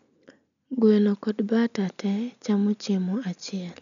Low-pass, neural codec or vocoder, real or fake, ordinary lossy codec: 7.2 kHz; none; real; none